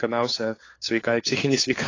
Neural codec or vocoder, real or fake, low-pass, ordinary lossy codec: codec, 16 kHz, 4 kbps, FunCodec, trained on LibriTTS, 50 frames a second; fake; 7.2 kHz; AAC, 32 kbps